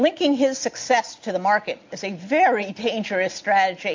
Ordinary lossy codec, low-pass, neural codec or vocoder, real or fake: MP3, 48 kbps; 7.2 kHz; none; real